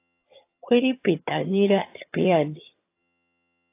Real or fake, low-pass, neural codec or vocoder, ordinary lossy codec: fake; 3.6 kHz; vocoder, 22.05 kHz, 80 mel bands, HiFi-GAN; AAC, 24 kbps